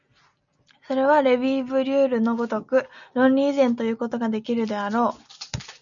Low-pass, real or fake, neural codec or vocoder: 7.2 kHz; real; none